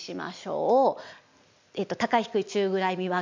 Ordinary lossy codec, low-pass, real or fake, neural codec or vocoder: MP3, 64 kbps; 7.2 kHz; real; none